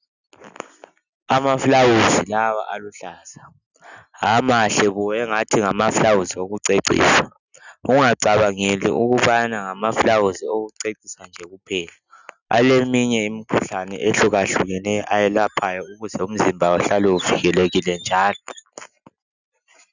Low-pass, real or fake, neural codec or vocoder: 7.2 kHz; real; none